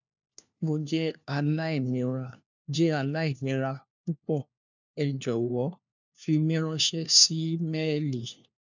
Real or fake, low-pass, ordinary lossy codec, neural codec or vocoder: fake; 7.2 kHz; none; codec, 16 kHz, 1 kbps, FunCodec, trained on LibriTTS, 50 frames a second